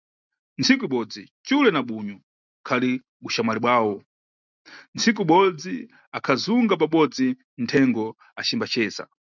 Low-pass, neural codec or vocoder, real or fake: 7.2 kHz; none; real